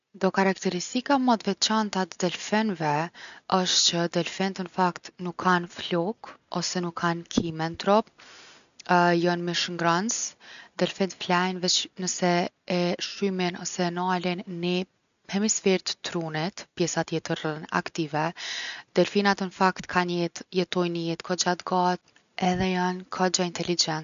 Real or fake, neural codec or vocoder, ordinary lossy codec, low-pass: real; none; none; 7.2 kHz